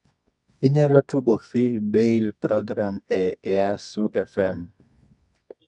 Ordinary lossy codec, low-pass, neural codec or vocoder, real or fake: none; 10.8 kHz; codec, 24 kHz, 0.9 kbps, WavTokenizer, medium music audio release; fake